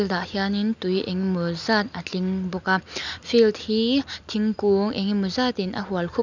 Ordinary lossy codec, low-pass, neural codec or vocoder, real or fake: none; 7.2 kHz; none; real